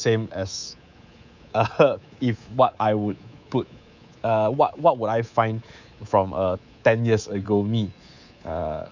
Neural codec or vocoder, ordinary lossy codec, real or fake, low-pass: codec, 24 kHz, 3.1 kbps, DualCodec; none; fake; 7.2 kHz